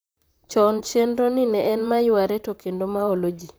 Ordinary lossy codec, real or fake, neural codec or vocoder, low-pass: none; fake; vocoder, 44.1 kHz, 128 mel bands, Pupu-Vocoder; none